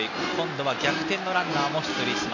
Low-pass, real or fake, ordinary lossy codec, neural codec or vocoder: 7.2 kHz; real; none; none